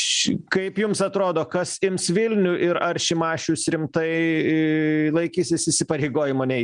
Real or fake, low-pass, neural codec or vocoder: real; 9.9 kHz; none